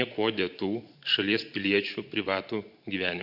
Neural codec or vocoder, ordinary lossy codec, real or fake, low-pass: vocoder, 44.1 kHz, 128 mel bands every 512 samples, BigVGAN v2; Opus, 64 kbps; fake; 5.4 kHz